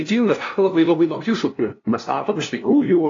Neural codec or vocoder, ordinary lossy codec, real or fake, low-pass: codec, 16 kHz, 0.5 kbps, FunCodec, trained on LibriTTS, 25 frames a second; AAC, 32 kbps; fake; 7.2 kHz